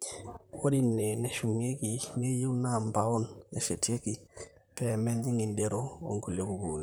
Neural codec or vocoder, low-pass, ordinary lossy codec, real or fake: vocoder, 44.1 kHz, 128 mel bands, Pupu-Vocoder; none; none; fake